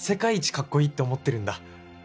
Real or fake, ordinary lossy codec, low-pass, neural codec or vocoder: real; none; none; none